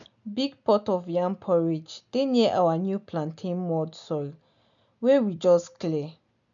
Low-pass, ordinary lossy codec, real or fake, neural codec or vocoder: 7.2 kHz; none; real; none